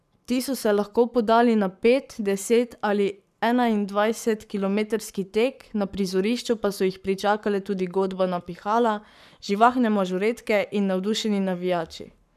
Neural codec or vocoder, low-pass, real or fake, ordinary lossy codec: codec, 44.1 kHz, 7.8 kbps, Pupu-Codec; 14.4 kHz; fake; none